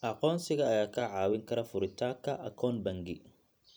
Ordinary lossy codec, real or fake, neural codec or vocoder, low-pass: none; fake; vocoder, 44.1 kHz, 128 mel bands every 256 samples, BigVGAN v2; none